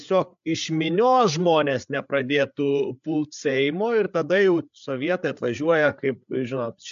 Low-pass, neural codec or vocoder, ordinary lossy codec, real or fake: 7.2 kHz; codec, 16 kHz, 8 kbps, FreqCodec, larger model; MP3, 64 kbps; fake